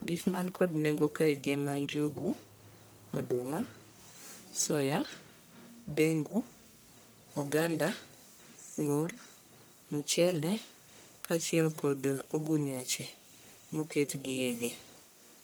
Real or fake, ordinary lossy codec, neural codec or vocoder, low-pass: fake; none; codec, 44.1 kHz, 1.7 kbps, Pupu-Codec; none